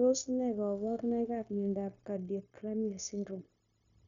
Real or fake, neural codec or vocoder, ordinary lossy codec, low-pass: fake; codec, 16 kHz, 0.9 kbps, LongCat-Audio-Codec; none; 7.2 kHz